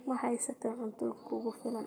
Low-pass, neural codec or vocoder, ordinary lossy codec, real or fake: none; codec, 44.1 kHz, 7.8 kbps, Pupu-Codec; none; fake